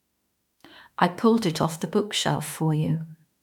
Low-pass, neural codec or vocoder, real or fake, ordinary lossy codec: 19.8 kHz; autoencoder, 48 kHz, 32 numbers a frame, DAC-VAE, trained on Japanese speech; fake; none